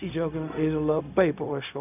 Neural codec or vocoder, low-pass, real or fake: codec, 16 kHz, 0.4 kbps, LongCat-Audio-Codec; 3.6 kHz; fake